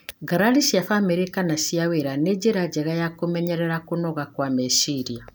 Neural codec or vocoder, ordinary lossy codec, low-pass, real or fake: none; none; none; real